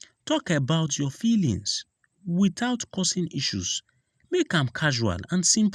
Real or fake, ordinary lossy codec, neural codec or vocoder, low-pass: real; none; none; none